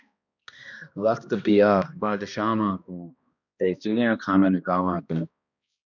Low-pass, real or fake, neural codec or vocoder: 7.2 kHz; fake; codec, 16 kHz, 1 kbps, X-Codec, HuBERT features, trained on balanced general audio